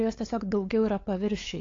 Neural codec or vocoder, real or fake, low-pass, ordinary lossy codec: codec, 16 kHz, 8 kbps, FunCodec, trained on LibriTTS, 25 frames a second; fake; 7.2 kHz; AAC, 32 kbps